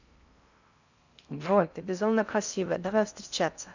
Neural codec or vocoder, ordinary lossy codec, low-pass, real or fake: codec, 16 kHz in and 24 kHz out, 0.6 kbps, FocalCodec, streaming, 4096 codes; none; 7.2 kHz; fake